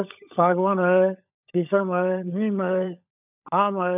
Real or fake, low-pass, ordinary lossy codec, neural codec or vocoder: fake; 3.6 kHz; none; codec, 16 kHz, 16 kbps, FunCodec, trained on LibriTTS, 50 frames a second